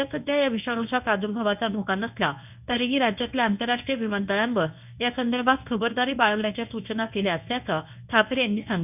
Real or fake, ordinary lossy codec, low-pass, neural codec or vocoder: fake; none; 3.6 kHz; codec, 24 kHz, 0.9 kbps, WavTokenizer, medium speech release version 1